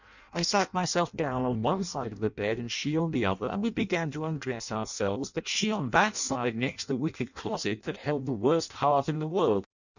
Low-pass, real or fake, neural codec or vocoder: 7.2 kHz; fake; codec, 16 kHz in and 24 kHz out, 0.6 kbps, FireRedTTS-2 codec